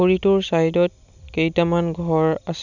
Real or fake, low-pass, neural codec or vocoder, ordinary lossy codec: real; 7.2 kHz; none; none